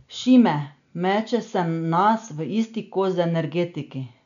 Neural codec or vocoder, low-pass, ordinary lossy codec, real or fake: none; 7.2 kHz; none; real